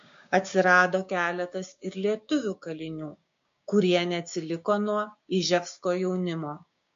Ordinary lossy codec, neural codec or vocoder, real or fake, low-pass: MP3, 48 kbps; codec, 16 kHz, 6 kbps, DAC; fake; 7.2 kHz